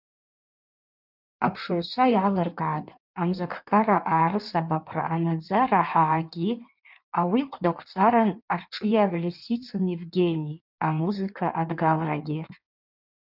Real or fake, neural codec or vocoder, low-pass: fake; codec, 16 kHz in and 24 kHz out, 1.1 kbps, FireRedTTS-2 codec; 5.4 kHz